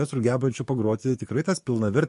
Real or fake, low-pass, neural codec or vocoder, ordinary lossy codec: fake; 14.4 kHz; codec, 44.1 kHz, 7.8 kbps, DAC; MP3, 48 kbps